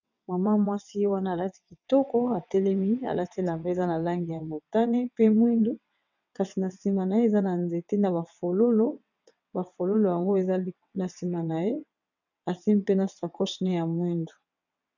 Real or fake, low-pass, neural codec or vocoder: fake; 7.2 kHz; vocoder, 22.05 kHz, 80 mel bands, WaveNeXt